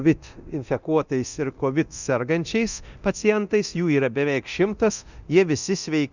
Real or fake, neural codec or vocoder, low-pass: fake; codec, 24 kHz, 0.9 kbps, DualCodec; 7.2 kHz